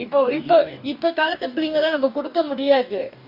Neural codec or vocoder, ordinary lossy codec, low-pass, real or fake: codec, 44.1 kHz, 2.6 kbps, DAC; none; 5.4 kHz; fake